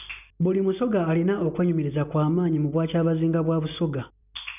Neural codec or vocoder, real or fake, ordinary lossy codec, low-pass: none; real; none; 3.6 kHz